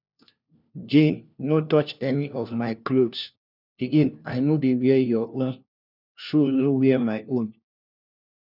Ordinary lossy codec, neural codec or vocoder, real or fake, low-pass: none; codec, 16 kHz, 1 kbps, FunCodec, trained on LibriTTS, 50 frames a second; fake; 5.4 kHz